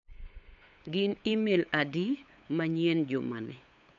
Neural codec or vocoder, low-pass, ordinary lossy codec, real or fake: codec, 16 kHz, 8 kbps, FunCodec, trained on LibriTTS, 25 frames a second; 7.2 kHz; AAC, 64 kbps; fake